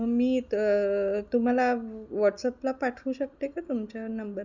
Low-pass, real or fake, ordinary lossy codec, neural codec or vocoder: 7.2 kHz; real; none; none